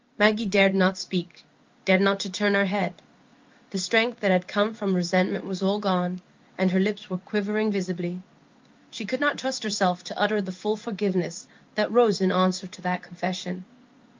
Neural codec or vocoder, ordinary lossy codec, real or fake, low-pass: codec, 16 kHz in and 24 kHz out, 1 kbps, XY-Tokenizer; Opus, 24 kbps; fake; 7.2 kHz